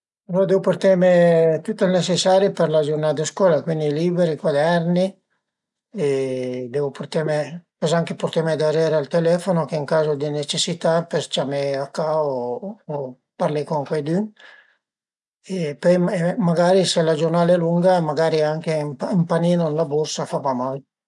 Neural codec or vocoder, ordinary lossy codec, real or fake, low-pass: none; none; real; 10.8 kHz